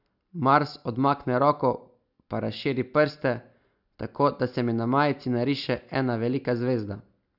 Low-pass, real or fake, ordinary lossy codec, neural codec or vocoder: 5.4 kHz; real; none; none